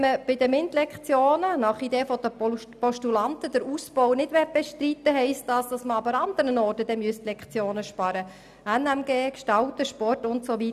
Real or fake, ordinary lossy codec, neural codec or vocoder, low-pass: real; none; none; 14.4 kHz